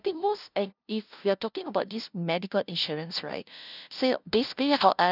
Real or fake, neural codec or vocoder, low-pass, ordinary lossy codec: fake; codec, 16 kHz, 0.5 kbps, FunCodec, trained on LibriTTS, 25 frames a second; 5.4 kHz; none